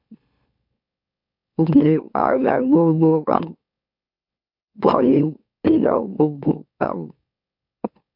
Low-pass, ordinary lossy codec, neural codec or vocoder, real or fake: 5.4 kHz; MP3, 48 kbps; autoencoder, 44.1 kHz, a latent of 192 numbers a frame, MeloTTS; fake